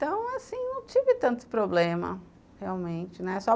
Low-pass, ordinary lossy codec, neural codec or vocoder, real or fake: none; none; none; real